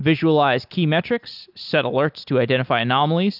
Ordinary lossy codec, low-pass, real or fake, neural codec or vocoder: AAC, 48 kbps; 5.4 kHz; real; none